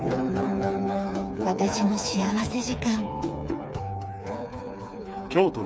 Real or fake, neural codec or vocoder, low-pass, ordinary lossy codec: fake; codec, 16 kHz, 4 kbps, FreqCodec, smaller model; none; none